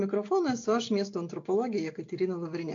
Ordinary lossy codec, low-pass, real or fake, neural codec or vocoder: AAC, 48 kbps; 7.2 kHz; real; none